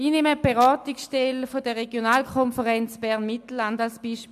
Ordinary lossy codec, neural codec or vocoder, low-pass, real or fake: MP3, 96 kbps; none; 14.4 kHz; real